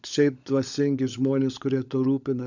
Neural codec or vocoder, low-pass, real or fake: codec, 16 kHz, 16 kbps, FunCodec, trained on LibriTTS, 50 frames a second; 7.2 kHz; fake